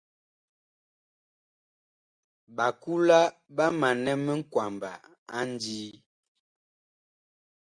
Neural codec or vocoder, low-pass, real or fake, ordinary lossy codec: none; 9.9 kHz; real; Opus, 64 kbps